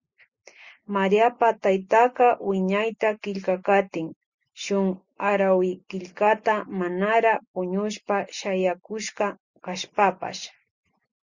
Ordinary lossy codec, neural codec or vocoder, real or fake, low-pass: Opus, 64 kbps; none; real; 7.2 kHz